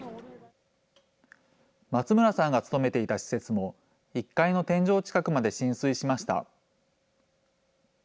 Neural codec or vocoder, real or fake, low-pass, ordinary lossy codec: none; real; none; none